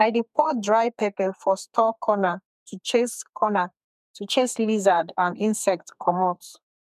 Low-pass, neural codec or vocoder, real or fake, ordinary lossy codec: 14.4 kHz; codec, 32 kHz, 1.9 kbps, SNAC; fake; MP3, 96 kbps